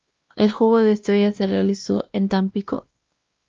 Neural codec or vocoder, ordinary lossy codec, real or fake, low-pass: codec, 16 kHz, 1 kbps, X-Codec, WavLM features, trained on Multilingual LibriSpeech; Opus, 32 kbps; fake; 7.2 kHz